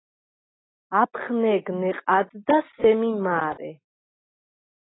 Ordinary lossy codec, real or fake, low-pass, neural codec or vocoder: AAC, 16 kbps; real; 7.2 kHz; none